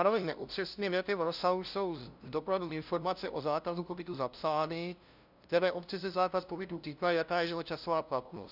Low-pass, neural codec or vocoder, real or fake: 5.4 kHz; codec, 16 kHz, 0.5 kbps, FunCodec, trained on LibriTTS, 25 frames a second; fake